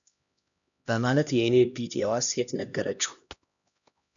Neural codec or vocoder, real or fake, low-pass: codec, 16 kHz, 1 kbps, X-Codec, HuBERT features, trained on LibriSpeech; fake; 7.2 kHz